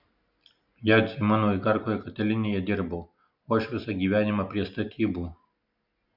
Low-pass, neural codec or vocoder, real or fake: 5.4 kHz; none; real